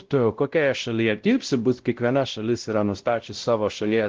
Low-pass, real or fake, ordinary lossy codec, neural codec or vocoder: 7.2 kHz; fake; Opus, 16 kbps; codec, 16 kHz, 0.5 kbps, X-Codec, WavLM features, trained on Multilingual LibriSpeech